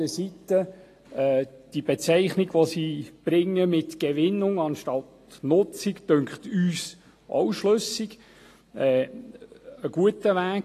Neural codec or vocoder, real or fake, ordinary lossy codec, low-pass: none; real; AAC, 48 kbps; 14.4 kHz